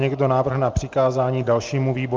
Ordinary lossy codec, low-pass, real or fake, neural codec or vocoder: Opus, 24 kbps; 7.2 kHz; real; none